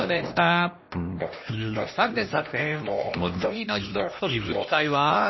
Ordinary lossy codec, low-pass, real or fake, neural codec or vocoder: MP3, 24 kbps; 7.2 kHz; fake; codec, 16 kHz, 1 kbps, X-Codec, HuBERT features, trained on LibriSpeech